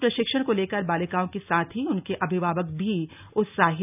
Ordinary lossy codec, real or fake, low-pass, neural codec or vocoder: none; real; 3.6 kHz; none